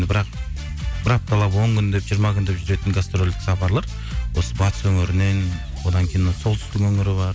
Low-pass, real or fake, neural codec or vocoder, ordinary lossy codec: none; real; none; none